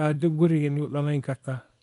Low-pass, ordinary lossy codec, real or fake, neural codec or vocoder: 10.8 kHz; none; fake; codec, 24 kHz, 0.9 kbps, WavTokenizer, small release